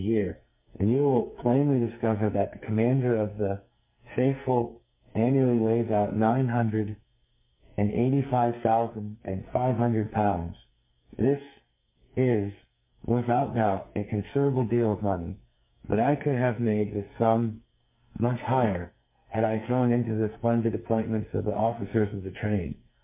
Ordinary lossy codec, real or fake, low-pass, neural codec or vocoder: MP3, 32 kbps; fake; 3.6 kHz; codec, 44.1 kHz, 2.6 kbps, SNAC